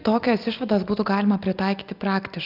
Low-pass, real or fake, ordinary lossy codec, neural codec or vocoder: 5.4 kHz; real; Opus, 24 kbps; none